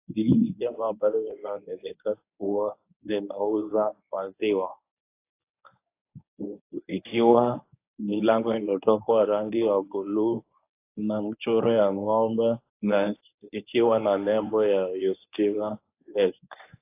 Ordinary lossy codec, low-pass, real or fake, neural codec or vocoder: AAC, 24 kbps; 3.6 kHz; fake; codec, 24 kHz, 0.9 kbps, WavTokenizer, medium speech release version 1